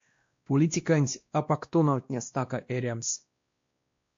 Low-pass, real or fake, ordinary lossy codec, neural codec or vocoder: 7.2 kHz; fake; MP3, 48 kbps; codec, 16 kHz, 1 kbps, X-Codec, WavLM features, trained on Multilingual LibriSpeech